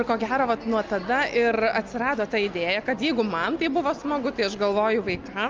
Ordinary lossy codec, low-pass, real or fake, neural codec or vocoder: Opus, 24 kbps; 7.2 kHz; real; none